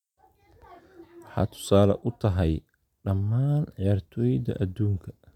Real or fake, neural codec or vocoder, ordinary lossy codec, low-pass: real; none; none; 19.8 kHz